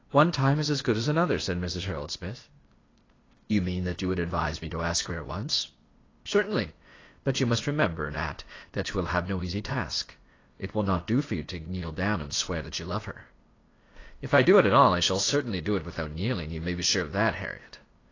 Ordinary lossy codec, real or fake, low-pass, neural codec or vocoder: AAC, 32 kbps; fake; 7.2 kHz; codec, 16 kHz, 0.8 kbps, ZipCodec